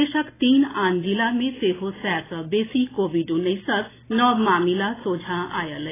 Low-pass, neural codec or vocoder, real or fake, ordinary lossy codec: 3.6 kHz; none; real; AAC, 16 kbps